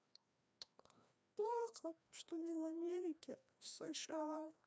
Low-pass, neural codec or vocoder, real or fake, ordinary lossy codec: none; codec, 16 kHz, 1 kbps, FreqCodec, larger model; fake; none